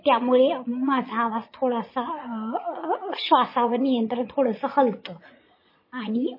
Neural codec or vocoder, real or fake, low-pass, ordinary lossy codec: vocoder, 22.05 kHz, 80 mel bands, Vocos; fake; 5.4 kHz; MP3, 24 kbps